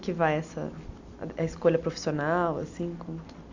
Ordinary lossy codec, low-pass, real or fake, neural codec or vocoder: AAC, 48 kbps; 7.2 kHz; real; none